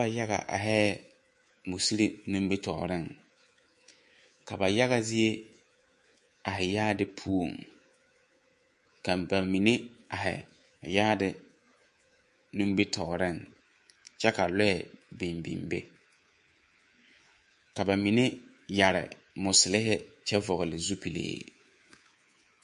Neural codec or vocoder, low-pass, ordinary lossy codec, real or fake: codec, 24 kHz, 3.1 kbps, DualCodec; 10.8 kHz; MP3, 48 kbps; fake